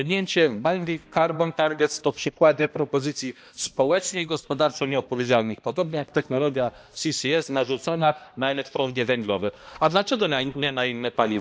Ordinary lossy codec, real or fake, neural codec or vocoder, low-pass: none; fake; codec, 16 kHz, 1 kbps, X-Codec, HuBERT features, trained on balanced general audio; none